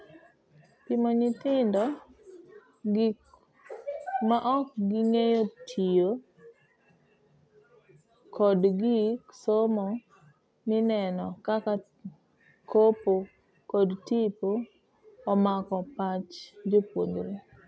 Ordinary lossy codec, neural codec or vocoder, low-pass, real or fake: none; none; none; real